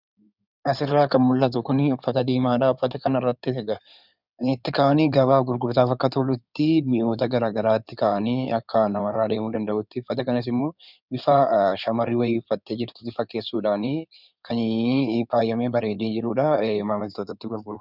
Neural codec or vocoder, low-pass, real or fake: codec, 16 kHz in and 24 kHz out, 2.2 kbps, FireRedTTS-2 codec; 5.4 kHz; fake